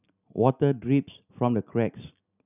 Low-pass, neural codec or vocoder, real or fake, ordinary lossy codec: 3.6 kHz; none; real; none